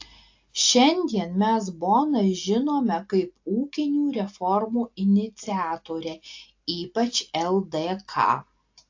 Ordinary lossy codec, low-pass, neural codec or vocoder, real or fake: AAC, 48 kbps; 7.2 kHz; none; real